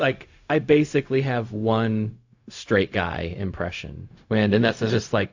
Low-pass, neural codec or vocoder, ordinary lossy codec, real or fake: 7.2 kHz; codec, 16 kHz, 0.4 kbps, LongCat-Audio-Codec; AAC, 48 kbps; fake